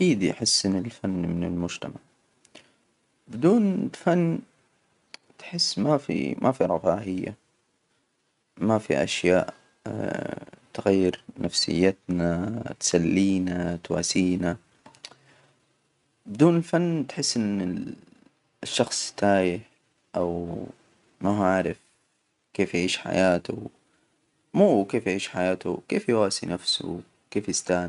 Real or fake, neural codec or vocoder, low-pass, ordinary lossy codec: real; none; 10.8 kHz; none